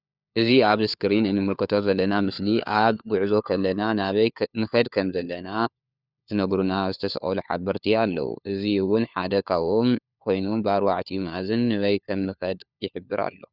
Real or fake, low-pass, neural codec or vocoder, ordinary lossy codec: fake; 5.4 kHz; codec, 16 kHz, 4 kbps, FunCodec, trained on LibriTTS, 50 frames a second; Opus, 64 kbps